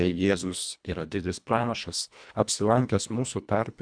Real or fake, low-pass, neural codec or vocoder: fake; 9.9 kHz; codec, 24 kHz, 1.5 kbps, HILCodec